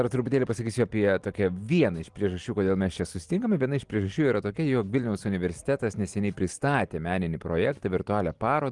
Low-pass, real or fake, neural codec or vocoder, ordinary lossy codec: 10.8 kHz; real; none; Opus, 24 kbps